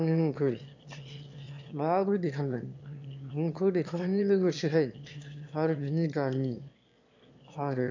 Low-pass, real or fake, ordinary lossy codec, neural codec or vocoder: 7.2 kHz; fake; MP3, 64 kbps; autoencoder, 22.05 kHz, a latent of 192 numbers a frame, VITS, trained on one speaker